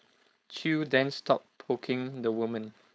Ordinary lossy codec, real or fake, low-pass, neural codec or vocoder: none; fake; none; codec, 16 kHz, 4.8 kbps, FACodec